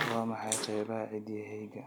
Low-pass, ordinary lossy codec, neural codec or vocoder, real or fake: none; none; none; real